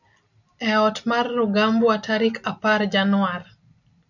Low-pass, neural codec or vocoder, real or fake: 7.2 kHz; none; real